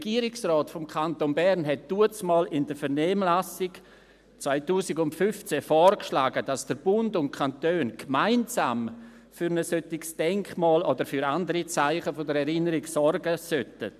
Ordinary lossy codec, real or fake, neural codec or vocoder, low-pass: none; real; none; 14.4 kHz